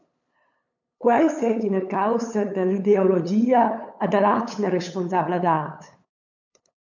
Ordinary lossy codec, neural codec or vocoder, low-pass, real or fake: MP3, 64 kbps; codec, 16 kHz, 8 kbps, FunCodec, trained on LibriTTS, 25 frames a second; 7.2 kHz; fake